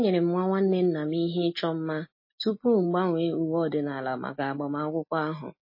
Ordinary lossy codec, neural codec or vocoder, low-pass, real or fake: MP3, 24 kbps; none; 5.4 kHz; real